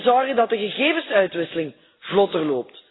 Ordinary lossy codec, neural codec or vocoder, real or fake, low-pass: AAC, 16 kbps; none; real; 7.2 kHz